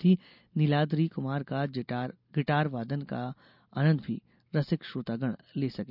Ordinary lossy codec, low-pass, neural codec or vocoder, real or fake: none; 5.4 kHz; none; real